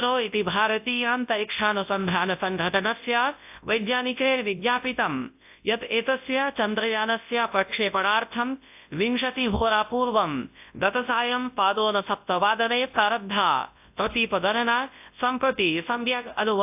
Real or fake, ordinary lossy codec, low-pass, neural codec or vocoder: fake; AAC, 32 kbps; 3.6 kHz; codec, 24 kHz, 0.9 kbps, WavTokenizer, large speech release